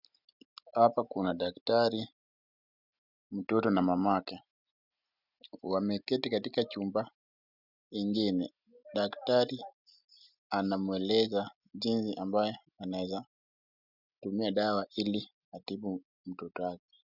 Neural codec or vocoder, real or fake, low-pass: none; real; 5.4 kHz